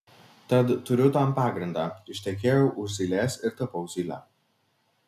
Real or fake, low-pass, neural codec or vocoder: real; 14.4 kHz; none